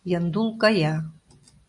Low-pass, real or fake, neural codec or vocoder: 10.8 kHz; real; none